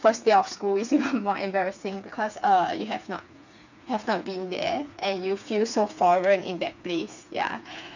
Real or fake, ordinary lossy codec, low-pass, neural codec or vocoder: fake; none; 7.2 kHz; codec, 16 kHz, 4 kbps, FreqCodec, smaller model